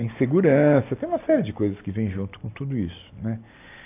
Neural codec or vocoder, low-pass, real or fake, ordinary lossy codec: none; 3.6 kHz; real; AAC, 24 kbps